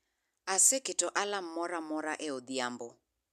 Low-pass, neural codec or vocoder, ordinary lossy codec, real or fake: 14.4 kHz; none; none; real